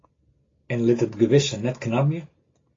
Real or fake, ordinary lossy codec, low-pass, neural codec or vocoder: real; AAC, 32 kbps; 7.2 kHz; none